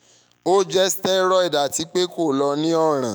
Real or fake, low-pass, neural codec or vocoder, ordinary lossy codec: fake; none; autoencoder, 48 kHz, 128 numbers a frame, DAC-VAE, trained on Japanese speech; none